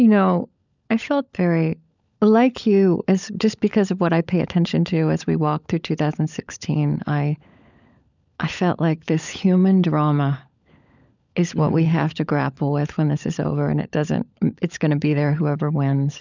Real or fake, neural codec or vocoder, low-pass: fake; codec, 16 kHz, 16 kbps, FunCodec, trained on LibriTTS, 50 frames a second; 7.2 kHz